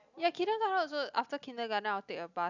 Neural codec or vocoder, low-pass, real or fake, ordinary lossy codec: none; 7.2 kHz; real; none